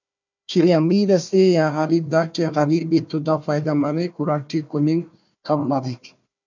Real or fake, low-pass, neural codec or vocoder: fake; 7.2 kHz; codec, 16 kHz, 1 kbps, FunCodec, trained on Chinese and English, 50 frames a second